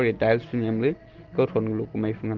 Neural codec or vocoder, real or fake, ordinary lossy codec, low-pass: none; real; Opus, 16 kbps; 7.2 kHz